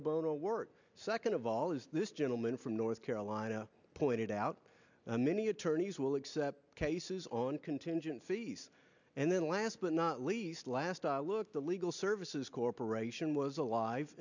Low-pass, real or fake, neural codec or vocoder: 7.2 kHz; real; none